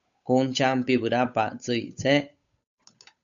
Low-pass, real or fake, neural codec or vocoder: 7.2 kHz; fake; codec, 16 kHz, 8 kbps, FunCodec, trained on Chinese and English, 25 frames a second